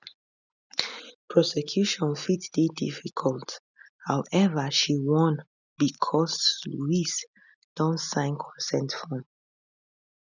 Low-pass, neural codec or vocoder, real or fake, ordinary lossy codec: 7.2 kHz; none; real; none